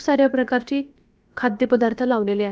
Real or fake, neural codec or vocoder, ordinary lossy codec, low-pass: fake; codec, 16 kHz, about 1 kbps, DyCAST, with the encoder's durations; none; none